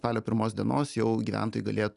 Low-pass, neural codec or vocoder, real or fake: 10.8 kHz; none; real